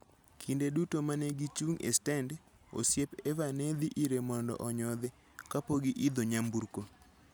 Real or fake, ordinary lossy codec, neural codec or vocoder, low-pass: real; none; none; none